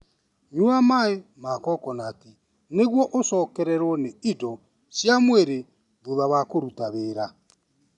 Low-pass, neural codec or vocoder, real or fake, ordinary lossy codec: 10.8 kHz; none; real; none